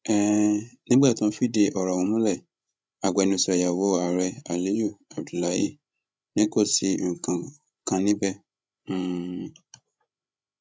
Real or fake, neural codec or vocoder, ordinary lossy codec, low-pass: fake; codec, 16 kHz, 16 kbps, FreqCodec, larger model; none; none